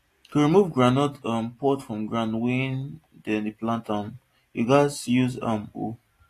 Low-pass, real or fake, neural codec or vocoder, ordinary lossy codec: 14.4 kHz; real; none; AAC, 48 kbps